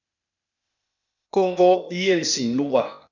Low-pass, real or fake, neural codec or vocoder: 7.2 kHz; fake; codec, 16 kHz, 0.8 kbps, ZipCodec